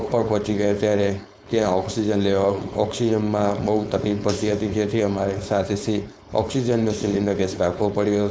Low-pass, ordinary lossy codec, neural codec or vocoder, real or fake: none; none; codec, 16 kHz, 4.8 kbps, FACodec; fake